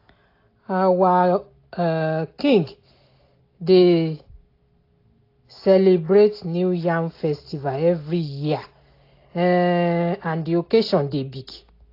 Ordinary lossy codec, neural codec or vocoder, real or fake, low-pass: AAC, 32 kbps; none; real; 5.4 kHz